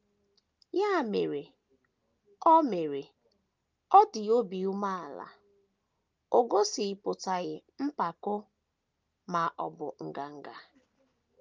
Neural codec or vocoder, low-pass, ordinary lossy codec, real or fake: none; 7.2 kHz; Opus, 24 kbps; real